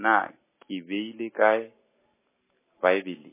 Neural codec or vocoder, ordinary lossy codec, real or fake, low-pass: none; MP3, 16 kbps; real; 3.6 kHz